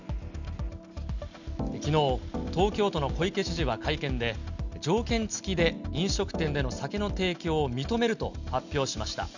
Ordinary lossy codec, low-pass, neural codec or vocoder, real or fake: none; 7.2 kHz; none; real